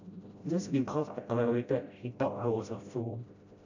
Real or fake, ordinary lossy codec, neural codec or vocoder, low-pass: fake; AAC, 48 kbps; codec, 16 kHz, 0.5 kbps, FreqCodec, smaller model; 7.2 kHz